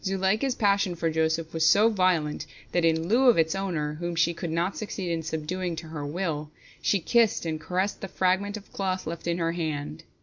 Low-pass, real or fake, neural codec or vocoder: 7.2 kHz; real; none